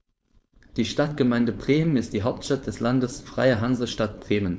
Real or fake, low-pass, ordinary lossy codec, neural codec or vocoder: fake; none; none; codec, 16 kHz, 4.8 kbps, FACodec